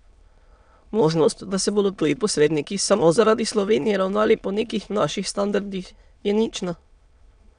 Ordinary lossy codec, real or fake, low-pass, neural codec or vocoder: none; fake; 9.9 kHz; autoencoder, 22.05 kHz, a latent of 192 numbers a frame, VITS, trained on many speakers